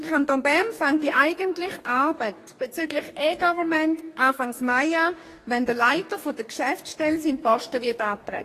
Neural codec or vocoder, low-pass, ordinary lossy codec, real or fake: codec, 44.1 kHz, 2.6 kbps, DAC; 14.4 kHz; AAC, 48 kbps; fake